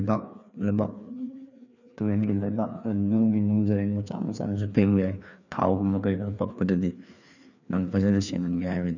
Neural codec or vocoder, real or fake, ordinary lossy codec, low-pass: codec, 16 kHz, 2 kbps, FreqCodec, larger model; fake; none; 7.2 kHz